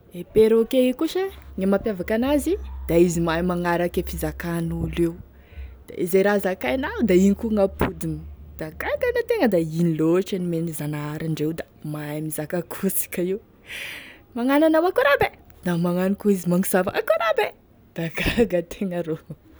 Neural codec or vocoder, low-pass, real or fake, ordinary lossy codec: none; none; real; none